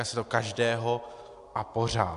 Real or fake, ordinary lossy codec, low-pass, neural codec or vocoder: real; AAC, 64 kbps; 10.8 kHz; none